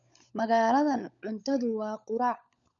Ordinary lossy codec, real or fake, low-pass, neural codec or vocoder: none; fake; 7.2 kHz; codec, 16 kHz, 16 kbps, FunCodec, trained on LibriTTS, 50 frames a second